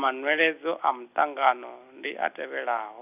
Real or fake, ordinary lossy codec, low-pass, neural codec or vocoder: real; none; 3.6 kHz; none